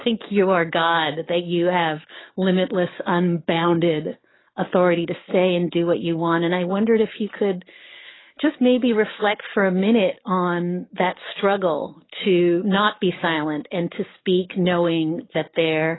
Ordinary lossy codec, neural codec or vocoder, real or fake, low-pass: AAC, 16 kbps; codec, 44.1 kHz, 7.8 kbps, DAC; fake; 7.2 kHz